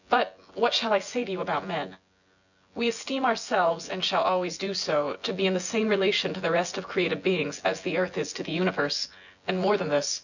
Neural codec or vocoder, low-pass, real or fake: vocoder, 24 kHz, 100 mel bands, Vocos; 7.2 kHz; fake